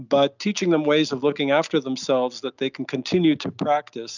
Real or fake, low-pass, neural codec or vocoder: real; 7.2 kHz; none